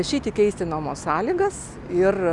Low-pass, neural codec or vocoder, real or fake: 10.8 kHz; none; real